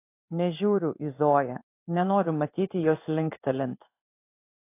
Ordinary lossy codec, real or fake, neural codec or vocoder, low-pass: AAC, 24 kbps; fake; codec, 16 kHz in and 24 kHz out, 1 kbps, XY-Tokenizer; 3.6 kHz